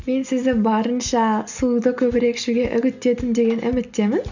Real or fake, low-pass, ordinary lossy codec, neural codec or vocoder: real; 7.2 kHz; none; none